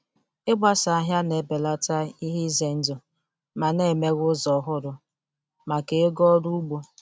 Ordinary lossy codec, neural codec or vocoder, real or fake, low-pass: none; none; real; none